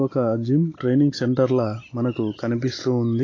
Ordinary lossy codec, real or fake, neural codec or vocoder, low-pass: AAC, 32 kbps; real; none; 7.2 kHz